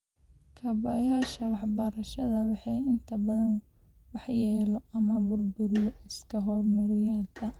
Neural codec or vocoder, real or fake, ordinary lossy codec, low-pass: vocoder, 48 kHz, 128 mel bands, Vocos; fake; Opus, 32 kbps; 19.8 kHz